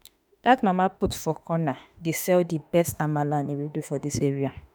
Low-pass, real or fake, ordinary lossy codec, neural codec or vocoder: none; fake; none; autoencoder, 48 kHz, 32 numbers a frame, DAC-VAE, trained on Japanese speech